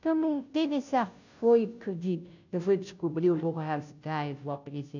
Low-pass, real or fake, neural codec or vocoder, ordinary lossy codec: 7.2 kHz; fake; codec, 16 kHz, 0.5 kbps, FunCodec, trained on Chinese and English, 25 frames a second; none